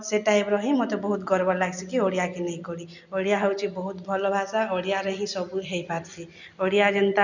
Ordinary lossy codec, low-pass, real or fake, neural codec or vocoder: none; 7.2 kHz; real; none